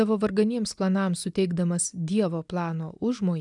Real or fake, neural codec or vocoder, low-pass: real; none; 10.8 kHz